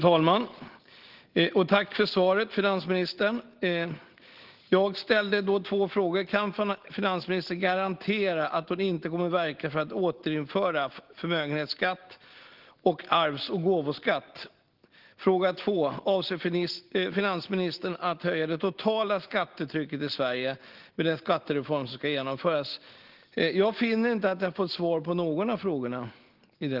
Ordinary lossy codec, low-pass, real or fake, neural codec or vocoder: Opus, 16 kbps; 5.4 kHz; real; none